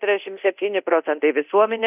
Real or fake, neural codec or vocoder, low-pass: fake; codec, 24 kHz, 0.9 kbps, DualCodec; 3.6 kHz